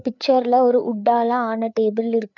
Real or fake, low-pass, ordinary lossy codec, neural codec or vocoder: fake; 7.2 kHz; none; codec, 16 kHz, 4 kbps, FreqCodec, larger model